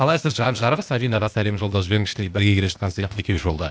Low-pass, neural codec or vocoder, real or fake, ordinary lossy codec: none; codec, 16 kHz, 0.8 kbps, ZipCodec; fake; none